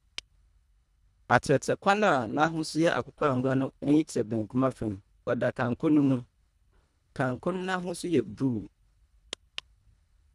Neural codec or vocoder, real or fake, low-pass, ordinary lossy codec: codec, 24 kHz, 1.5 kbps, HILCodec; fake; none; none